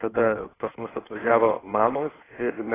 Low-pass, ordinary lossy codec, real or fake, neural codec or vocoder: 3.6 kHz; AAC, 16 kbps; fake; codec, 16 kHz in and 24 kHz out, 1.1 kbps, FireRedTTS-2 codec